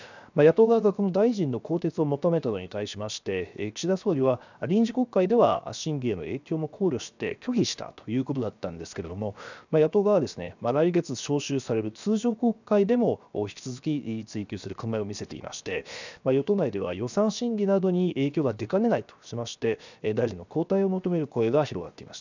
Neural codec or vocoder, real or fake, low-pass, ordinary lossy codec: codec, 16 kHz, 0.7 kbps, FocalCodec; fake; 7.2 kHz; none